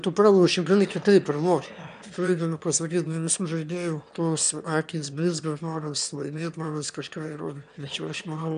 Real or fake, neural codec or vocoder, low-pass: fake; autoencoder, 22.05 kHz, a latent of 192 numbers a frame, VITS, trained on one speaker; 9.9 kHz